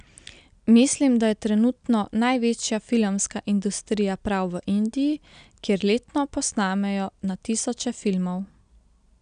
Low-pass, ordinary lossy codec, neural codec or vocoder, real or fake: 9.9 kHz; none; none; real